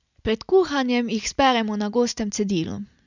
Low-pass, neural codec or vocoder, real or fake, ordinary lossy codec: 7.2 kHz; none; real; none